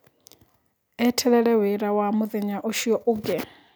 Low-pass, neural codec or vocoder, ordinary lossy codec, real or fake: none; none; none; real